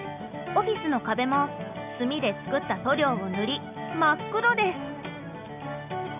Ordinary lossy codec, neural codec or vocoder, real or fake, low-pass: none; none; real; 3.6 kHz